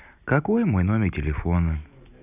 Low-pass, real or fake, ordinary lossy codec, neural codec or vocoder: 3.6 kHz; real; none; none